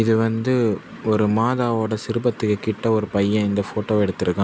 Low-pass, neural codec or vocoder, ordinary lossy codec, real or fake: none; none; none; real